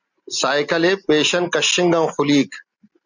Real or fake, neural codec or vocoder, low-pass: real; none; 7.2 kHz